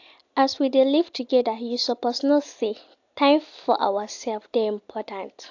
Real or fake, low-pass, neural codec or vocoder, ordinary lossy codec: real; 7.2 kHz; none; AAC, 48 kbps